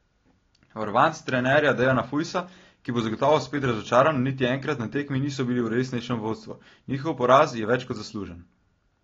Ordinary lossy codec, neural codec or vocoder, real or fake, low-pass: AAC, 32 kbps; none; real; 7.2 kHz